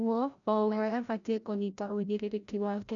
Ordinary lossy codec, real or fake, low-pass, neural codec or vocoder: none; fake; 7.2 kHz; codec, 16 kHz, 0.5 kbps, FreqCodec, larger model